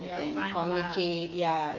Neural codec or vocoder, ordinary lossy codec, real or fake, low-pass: codec, 16 kHz, 4 kbps, FreqCodec, smaller model; none; fake; 7.2 kHz